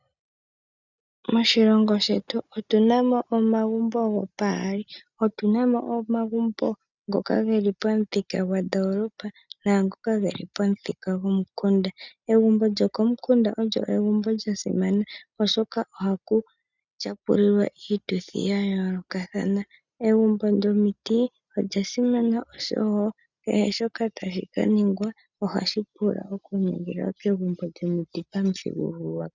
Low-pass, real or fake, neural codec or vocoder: 7.2 kHz; real; none